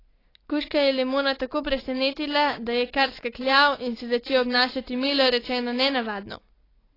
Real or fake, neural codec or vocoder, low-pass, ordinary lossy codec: real; none; 5.4 kHz; AAC, 24 kbps